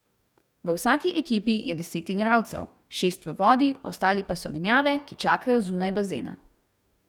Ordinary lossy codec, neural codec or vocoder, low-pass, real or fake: none; codec, 44.1 kHz, 2.6 kbps, DAC; 19.8 kHz; fake